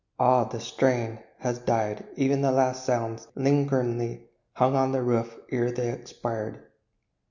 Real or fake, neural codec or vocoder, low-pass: real; none; 7.2 kHz